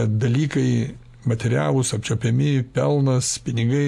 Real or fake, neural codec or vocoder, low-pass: real; none; 14.4 kHz